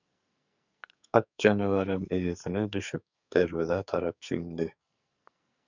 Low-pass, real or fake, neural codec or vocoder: 7.2 kHz; fake; codec, 44.1 kHz, 2.6 kbps, SNAC